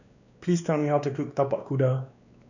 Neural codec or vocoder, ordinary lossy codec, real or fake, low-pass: codec, 16 kHz, 2 kbps, X-Codec, WavLM features, trained on Multilingual LibriSpeech; none; fake; 7.2 kHz